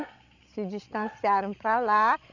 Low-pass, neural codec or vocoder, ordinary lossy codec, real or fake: 7.2 kHz; codec, 16 kHz, 16 kbps, FreqCodec, larger model; none; fake